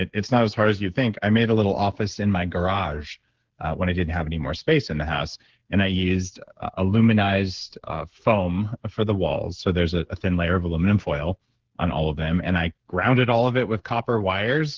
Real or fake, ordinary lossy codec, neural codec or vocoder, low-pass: fake; Opus, 16 kbps; codec, 16 kHz, 8 kbps, FreqCodec, smaller model; 7.2 kHz